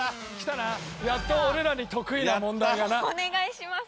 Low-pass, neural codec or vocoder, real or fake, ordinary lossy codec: none; none; real; none